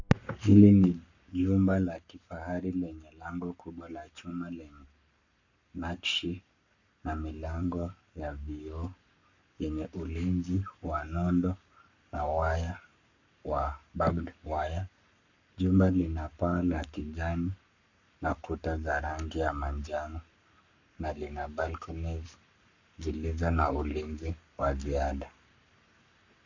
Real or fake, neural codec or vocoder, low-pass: fake; codec, 44.1 kHz, 7.8 kbps, Pupu-Codec; 7.2 kHz